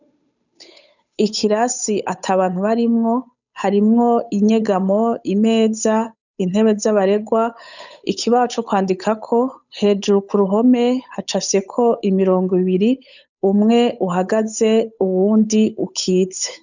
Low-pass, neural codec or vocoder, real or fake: 7.2 kHz; codec, 16 kHz, 8 kbps, FunCodec, trained on Chinese and English, 25 frames a second; fake